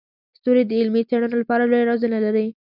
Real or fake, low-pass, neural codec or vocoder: real; 5.4 kHz; none